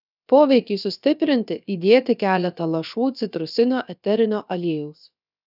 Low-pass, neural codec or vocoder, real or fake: 5.4 kHz; codec, 16 kHz, about 1 kbps, DyCAST, with the encoder's durations; fake